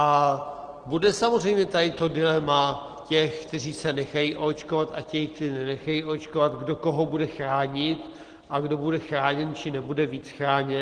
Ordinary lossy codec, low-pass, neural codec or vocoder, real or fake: Opus, 16 kbps; 9.9 kHz; none; real